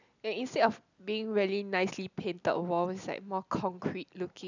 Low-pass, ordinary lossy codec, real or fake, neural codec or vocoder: 7.2 kHz; none; real; none